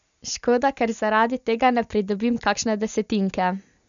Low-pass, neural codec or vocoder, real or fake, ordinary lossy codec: 7.2 kHz; none; real; none